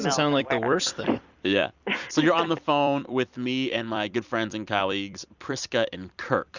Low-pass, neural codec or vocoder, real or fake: 7.2 kHz; vocoder, 44.1 kHz, 128 mel bands every 256 samples, BigVGAN v2; fake